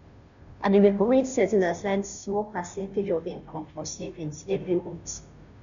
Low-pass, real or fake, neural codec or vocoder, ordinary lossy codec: 7.2 kHz; fake; codec, 16 kHz, 0.5 kbps, FunCodec, trained on Chinese and English, 25 frames a second; none